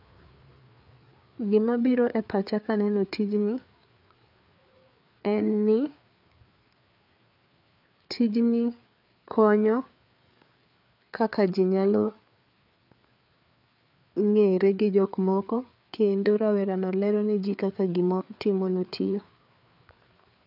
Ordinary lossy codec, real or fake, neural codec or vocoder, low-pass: none; fake; codec, 16 kHz, 4 kbps, FreqCodec, larger model; 5.4 kHz